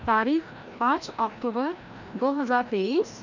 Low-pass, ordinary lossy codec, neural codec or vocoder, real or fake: 7.2 kHz; none; codec, 16 kHz, 1 kbps, FreqCodec, larger model; fake